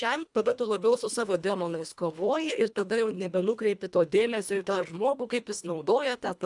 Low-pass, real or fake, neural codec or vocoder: 10.8 kHz; fake; codec, 24 kHz, 1.5 kbps, HILCodec